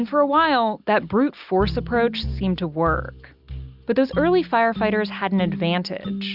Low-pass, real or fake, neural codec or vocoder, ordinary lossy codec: 5.4 kHz; real; none; Opus, 64 kbps